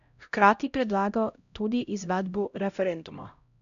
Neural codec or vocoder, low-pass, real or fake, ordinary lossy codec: codec, 16 kHz, 0.5 kbps, X-Codec, HuBERT features, trained on LibriSpeech; 7.2 kHz; fake; MP3, 96 kbps